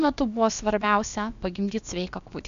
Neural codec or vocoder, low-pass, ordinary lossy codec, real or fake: codec, 16 kHz, about 1 kbps, DyCAST, with the encoder's durations; 7.2 kHz; MP3, 64 kbps; fake